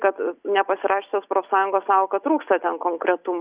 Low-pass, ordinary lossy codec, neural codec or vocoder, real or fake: 3.6 kHz; Opus, 64 kbps; none; real